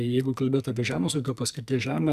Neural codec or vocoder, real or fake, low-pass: codec, 44.1 kHz, 2.6 kbps, SNAC; fake; 14.4 kHz